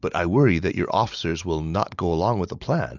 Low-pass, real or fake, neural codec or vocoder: 7.2 kHz; real; none